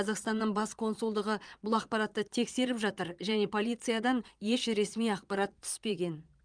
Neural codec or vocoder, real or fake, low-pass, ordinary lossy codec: vocoder, 22.05 kHz, 80 mel bands, Vocos; fake; 9.9 kHz; Opus, 32 kbps